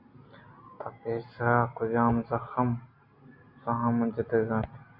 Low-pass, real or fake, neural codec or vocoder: 5.4 kHz; real; none